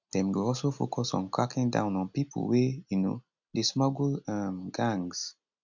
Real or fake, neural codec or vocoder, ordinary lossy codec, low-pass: real; none; none; 7.2 kHz